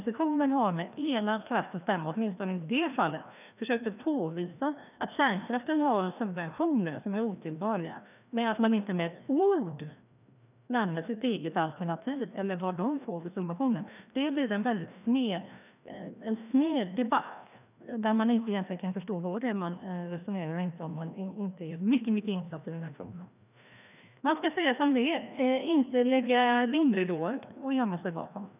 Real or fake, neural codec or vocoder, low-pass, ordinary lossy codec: fake; codec, 16 kHz, 1 kbps, FreqCodec, larger model; 3.6 kHz; none